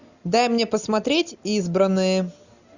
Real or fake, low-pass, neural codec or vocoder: real; 7.2 kHz; none